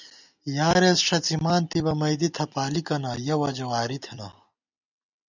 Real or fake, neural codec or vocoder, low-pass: real; none; 7.2 kHz